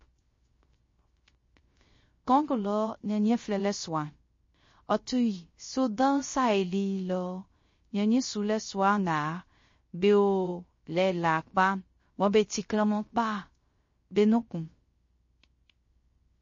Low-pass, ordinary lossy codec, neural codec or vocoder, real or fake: 7.2 kHz; MP3, 32 kbps; codec, 16 kHz, 0.3 kbps, FocalCodec; fake